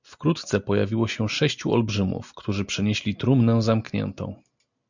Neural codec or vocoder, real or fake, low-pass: none; real; 7.2 kHz